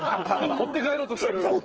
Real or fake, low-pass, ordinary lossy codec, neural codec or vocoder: fake; 7.2 kHz; Opus, 16 kbps; codec, 16 kHz, 8 kbps, FreqCodec, smaller model